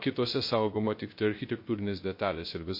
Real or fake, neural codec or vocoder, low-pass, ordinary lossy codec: fake; codec, 16 kHz, 0.3 kbps, FocalCodec; 5.4 kHz; MP3, 32 kbps